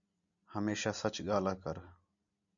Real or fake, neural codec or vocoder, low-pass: real; none; 7.2 kHz